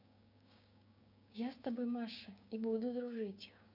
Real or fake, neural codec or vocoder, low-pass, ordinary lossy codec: fake; codec, 16 kHz, 6 kbps, DAC; 5.4 kHz; none